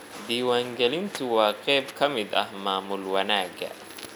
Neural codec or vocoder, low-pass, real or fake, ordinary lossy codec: none; 19.8 kHz; real; none